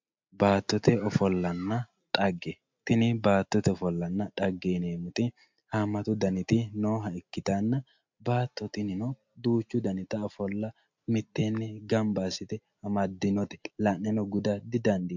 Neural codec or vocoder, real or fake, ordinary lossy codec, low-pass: none; real; MP3, 64 kbps; 7.2 kHz